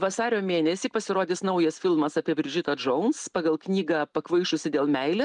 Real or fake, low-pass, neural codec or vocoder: real; 10.8 kHz; none